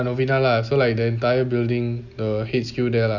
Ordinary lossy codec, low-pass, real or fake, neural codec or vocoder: none; 7.2 kHz; real; none